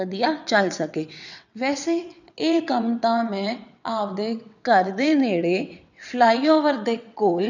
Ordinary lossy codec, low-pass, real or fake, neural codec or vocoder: none; 7.2 kHz; fake; vocoder, 22.05 kHz, 80 mel bands, WaveNeXt